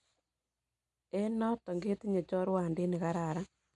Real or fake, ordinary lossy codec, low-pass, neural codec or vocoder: real; none; 10.8 kHz; none